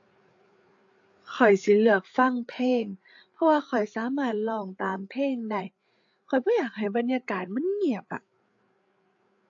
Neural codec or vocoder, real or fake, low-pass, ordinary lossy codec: codec, 16 kHz, 8 kbps, FreqCodec, larger model; fake; 7.2 kHz; AAC, 48 kbps